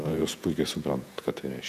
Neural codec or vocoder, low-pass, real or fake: vocoder, 48 kHz, 128 mel bands, Vocos; 14.4 kHz; fake